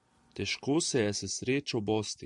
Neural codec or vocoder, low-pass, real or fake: vocoder, 44.1 kHz, 128 mel bands every 512 samples, BigVGAN v2; 10.8 kHz; fake